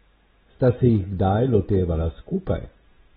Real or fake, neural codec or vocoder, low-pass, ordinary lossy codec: real; none; 10.8 kHz; AAC, 16 kbps